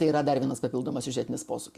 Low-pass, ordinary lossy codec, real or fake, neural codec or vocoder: 14.4 kHz; Opus, 64 kbps; real; none